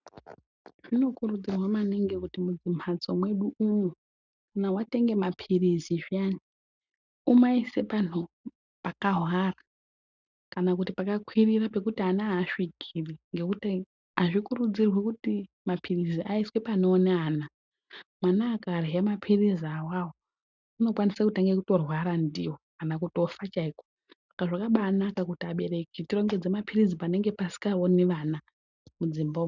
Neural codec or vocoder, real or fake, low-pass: none; real; 7.2 kHz